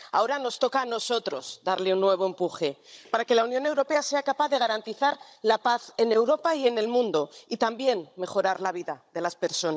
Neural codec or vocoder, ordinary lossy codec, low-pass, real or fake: codec, 16 kHz, 16 kbps, FunCodec, trained on Chinese and English, 50 frames a second; none; none; fake